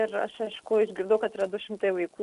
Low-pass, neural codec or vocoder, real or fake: 10.8 kHz; none; real